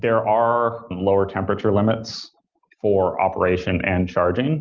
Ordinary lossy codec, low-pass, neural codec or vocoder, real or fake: Opus, 24 kbps; 7.2 kHz; none; real